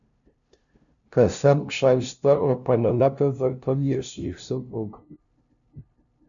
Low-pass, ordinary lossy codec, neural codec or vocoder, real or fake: 7.2 kHz; MP3, 96 kbps; codec, 16 kHz, 0.5 kbps, FunCodec, trained on LibriTTS, 25 frames a second; fake